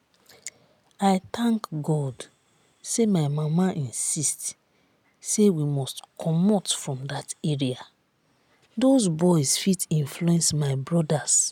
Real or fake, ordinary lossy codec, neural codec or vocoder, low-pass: real; none; none; 19.8 kHz